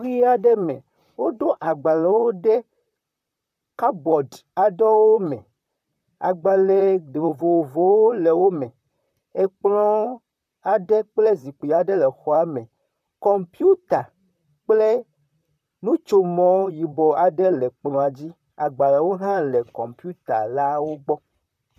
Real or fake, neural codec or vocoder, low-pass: fake; vocoder, 44.1 kHz, 128 mel bands, Pupu-Vocoder; 14.4 kHz